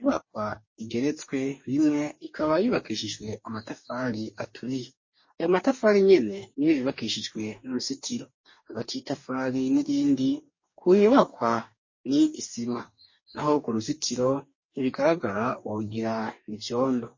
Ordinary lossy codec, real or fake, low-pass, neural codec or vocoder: MP3, 32 kbps; fake; 7.2 kHz; codec, 44.1 kHz, 2.6 kbps, DAC